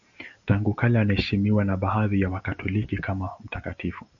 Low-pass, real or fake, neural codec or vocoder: 7.2 kHz; real; none